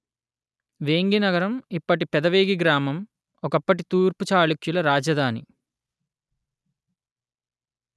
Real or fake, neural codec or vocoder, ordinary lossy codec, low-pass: real; none; none; none